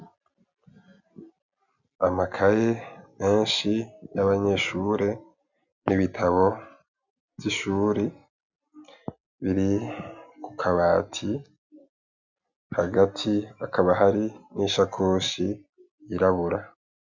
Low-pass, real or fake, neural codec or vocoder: 7.2 kHz; real; none